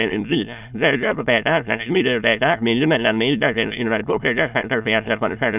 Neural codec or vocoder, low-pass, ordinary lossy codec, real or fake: autoencoder, 22.05 kHz, a latent of 192 numbers a frame, VITS, trained on many speakers; 3.6 kHz; none; fake